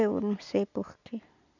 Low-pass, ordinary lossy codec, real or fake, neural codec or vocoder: 7.2 kHz; none; real; none